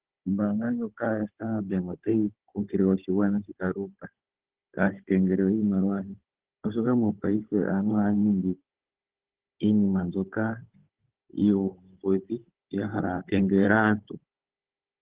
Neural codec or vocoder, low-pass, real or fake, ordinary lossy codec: codec, 16 kHz, 16 kbps, FunCodec, trained on Chinese and English, 50 frames a second; 3.6 kHz; fake; Opus, 16 kbps